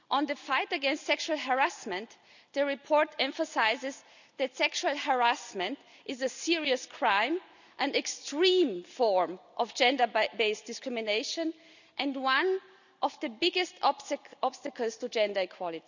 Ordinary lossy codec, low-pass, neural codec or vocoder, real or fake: none; 7.2 kHz; none; real